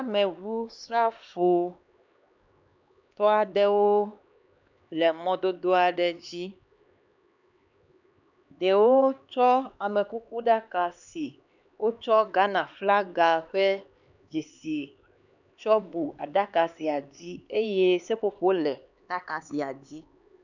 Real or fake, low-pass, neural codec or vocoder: fake; 7.2 kHz; codec, 16 kHz, 4 kbps, X-Codec, HuBERT features, trained on LibriSpeech